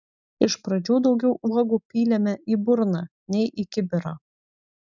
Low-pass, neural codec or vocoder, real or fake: 7.2 kHz; none; real